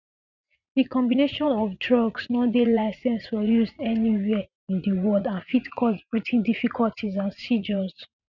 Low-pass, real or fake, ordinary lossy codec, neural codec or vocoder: 7.2 kHz; fake; none; vocoder, 44.1 kHz, 80 mel bands, Vocos